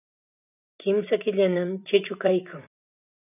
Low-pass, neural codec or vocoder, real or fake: 3.6 kHz; vocoder, 44.1 kHz, 128 mel bands every 512 samples, BigVGAN v2; fake